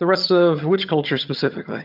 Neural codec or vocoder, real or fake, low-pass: vocoder, 22.05 kHz, 80 mel bands, HiFi-GAN; fake; 5.4 kHz